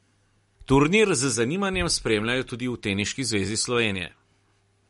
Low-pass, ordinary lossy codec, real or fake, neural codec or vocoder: 14.4 kHz; MP3, 48 kbps; real; none